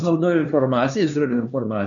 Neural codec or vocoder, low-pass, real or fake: codec, 16 kHz, 2 kbps, X-Codec, HuBERT features, trained on LibriSpeech; 7.2 kHz; fake